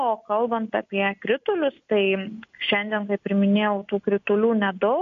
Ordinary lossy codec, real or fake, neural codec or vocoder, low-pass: MP3, 48 kbps; real; none; 7.2 kHz